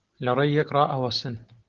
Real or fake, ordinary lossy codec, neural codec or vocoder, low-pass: real; Opus, 16 kbps; none; 7.2 kHz